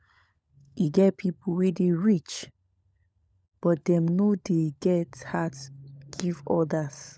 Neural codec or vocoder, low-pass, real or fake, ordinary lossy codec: codec, 16 kHz, 16 kbps, FunCodec, trained on LibriTTS, 50 frames a second; none; fake; none